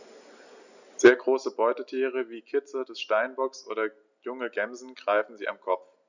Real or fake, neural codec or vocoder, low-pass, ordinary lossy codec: real; none; 7.2 kHz; none